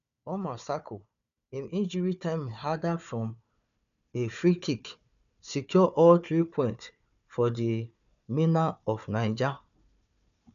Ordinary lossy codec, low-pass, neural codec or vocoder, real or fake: none; 7.2 kHz; codec, 16 kHz, 4 kbps, FunCodec, trained on Chinese and English, 50 frames a second; fake